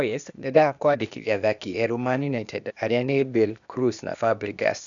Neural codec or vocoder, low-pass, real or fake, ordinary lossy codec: codec, 16 kHz, 0.8 kbps, ZipCodec; 7.2 kHz; fake; none